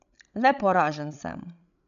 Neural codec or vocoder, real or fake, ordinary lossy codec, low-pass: codec, 16 kHz, 16 kbps, FreqCodec, larger model; fake; none; 7.2 kHz